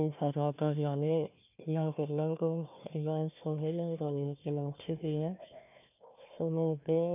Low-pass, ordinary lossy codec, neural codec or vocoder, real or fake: 3.6 kHz; none; codec, 16 kHz, 1 kbps, FunCodec, trained on Chinese and English, 50 frames a second; fake